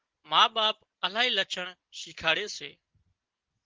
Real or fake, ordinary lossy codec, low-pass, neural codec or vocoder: real; Opus, 16 kbps; 7.2 kHz; none